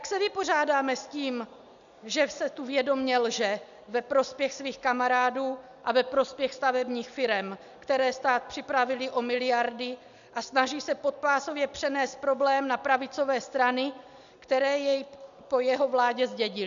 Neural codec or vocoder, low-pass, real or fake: none; 7.2 kHz; real